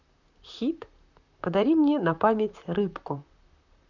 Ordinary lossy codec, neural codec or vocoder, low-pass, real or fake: none; vocoder, 44.1 kHz, 128 mel bands, Pupu-Vocoder; 7.2 kHz; fake